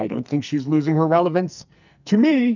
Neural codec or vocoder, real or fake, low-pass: codec, 44.1 kHz, 2.6 kbps, SNAC; fake; 7.2 kHz